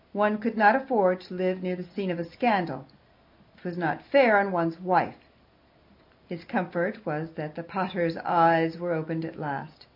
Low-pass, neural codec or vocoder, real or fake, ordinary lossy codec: 5.4 kHz; none; real; MP3, 48 kbps